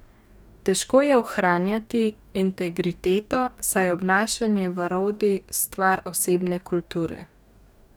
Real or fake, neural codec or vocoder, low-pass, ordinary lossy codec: fake; codec, 44.1 kHz, 2.6 kbps, DAC; none; none